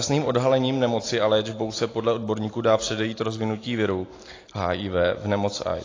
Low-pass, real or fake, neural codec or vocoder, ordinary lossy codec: 7.2 kHz; real; none; AAC, 32 kbps